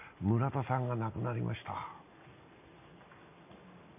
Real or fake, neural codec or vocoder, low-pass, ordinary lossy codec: real; none; 3.6 kHz; none